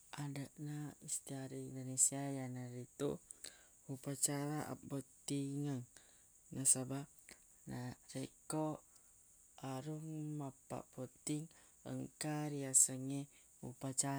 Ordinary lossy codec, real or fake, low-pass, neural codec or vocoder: none; real; none; none